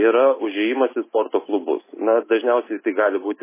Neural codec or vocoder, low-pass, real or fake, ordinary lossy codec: none; 3.6 kHz; real; MP3, 16 kbps